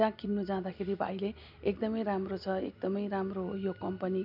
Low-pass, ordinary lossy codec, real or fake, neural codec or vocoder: 5.4 kHz; none; real; none